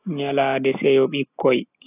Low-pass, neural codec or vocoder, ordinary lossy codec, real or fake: 3.6 kHz; none; AAC, 32 kbps; real